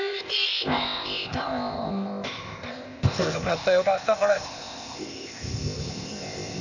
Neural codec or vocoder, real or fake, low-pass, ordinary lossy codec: codec, 16 kHz, 0.8 kbps, ZipCodec; fake; 7.2 kHz; none